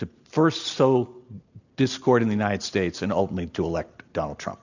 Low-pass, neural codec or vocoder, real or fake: 7.2 kHz; none; real